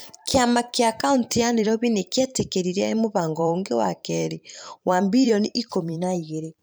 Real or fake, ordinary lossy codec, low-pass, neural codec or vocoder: fake; none; none; vocoder, 44.1 kHz, 128 mel bands every 512 samples, BigVGAN v2